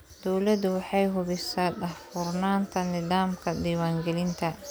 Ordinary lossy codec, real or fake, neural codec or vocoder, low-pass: none; real; none; none